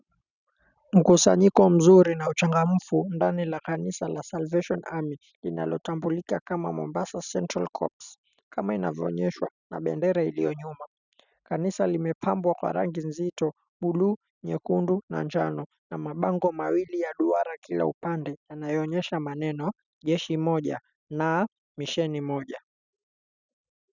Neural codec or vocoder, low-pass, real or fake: none; 7.2 kHz; real